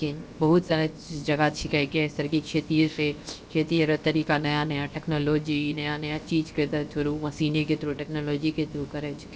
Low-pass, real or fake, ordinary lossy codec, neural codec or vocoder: none; fake; none; codec, 16 kHz, 0.7 kbps, FocalCodec